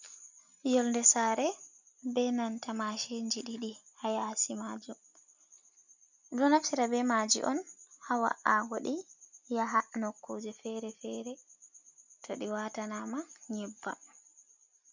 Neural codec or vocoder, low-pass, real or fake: none; 7.2 kHz; real